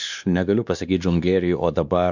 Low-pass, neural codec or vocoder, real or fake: 7.2 kHz; codec, 16 kHz, 2 kbps, X-Codec, WavLM features, trained on Multilingual LibriSpeech; fake